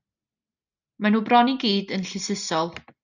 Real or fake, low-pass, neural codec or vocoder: real; 7.2 kHz; none